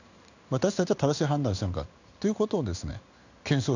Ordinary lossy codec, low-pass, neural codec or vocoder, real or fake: none; 7.2 kHz; codec, 16 kHz in and 24 kHz out, 1 kbps, XY-Tokenizer; fake